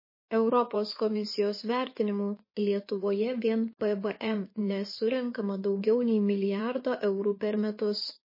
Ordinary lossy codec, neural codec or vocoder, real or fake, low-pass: MP3, 24 kbps; codec, 16 kHz in and 24 kHz out, 2.2 kbps, FireRedTTS-2 codec; fake; 5.4 kHz